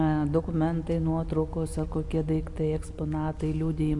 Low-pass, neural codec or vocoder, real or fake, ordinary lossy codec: 10.8 kHz; none; real; MP3, 64 kbps